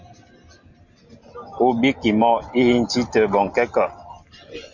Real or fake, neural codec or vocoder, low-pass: real; none; 7.2 kHz